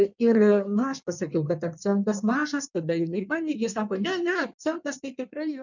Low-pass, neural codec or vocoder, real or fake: 7.2 kHz; codec, 16 kHz in and 24 kHz out, 1.1 kbps, FireRedTTS-2 codec; fake